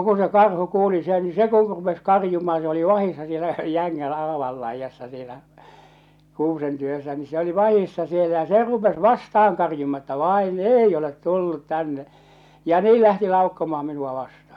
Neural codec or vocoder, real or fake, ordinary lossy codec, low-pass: none; real; none; 19.8 kHz